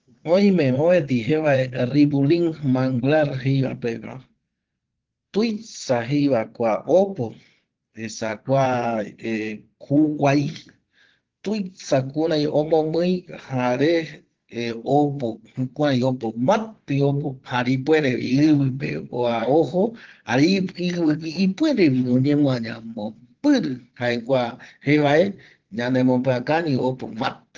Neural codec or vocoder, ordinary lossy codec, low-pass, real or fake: vocoder, 22.05 kHz, 80 mel bands, Vocos; Opus, 16 kbps; 7.2 kHz; fake